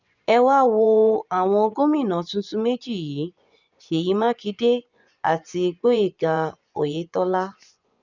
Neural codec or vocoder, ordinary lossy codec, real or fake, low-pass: vocoder, 24 kHz, 100 mel bands, Vocos; none; fake; 7.2 kHz